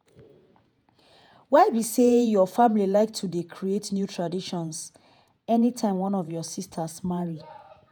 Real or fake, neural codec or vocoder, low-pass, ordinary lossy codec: fake; vocoder, 48 kHz, 128 mel bands, Vocos; none; none